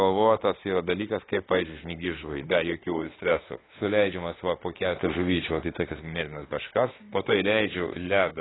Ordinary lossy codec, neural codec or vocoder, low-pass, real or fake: AAC, 16 kbps; autoencoder, 48 kHz, 32 numbers a frame, DAC-VAE, trained on Japanese speech; 7.2 kHz; fake